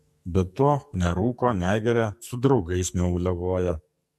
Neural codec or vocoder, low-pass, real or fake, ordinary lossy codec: codec, 44.1 kHz, 2.6 kbps, SNAC; 14.4 kHz; fake; MP3, 64 kbps